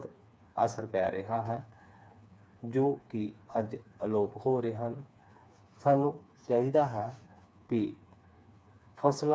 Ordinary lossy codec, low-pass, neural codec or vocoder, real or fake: none; none; codec, 16 kHz, 4 kbps, FreqCodec, smaller model; fake